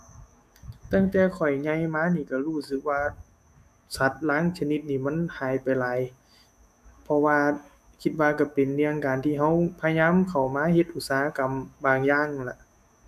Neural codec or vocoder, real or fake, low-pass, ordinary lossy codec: autoencoder, 48 kHz, 128 numbers a frame, DAC-VAE, trained on Japanese speech; fake; 14.4 kHz; none